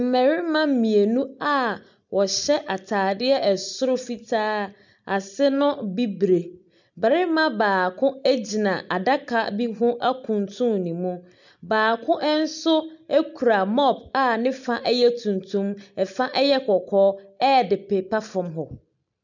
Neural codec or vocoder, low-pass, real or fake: none; 7.2 kHz; real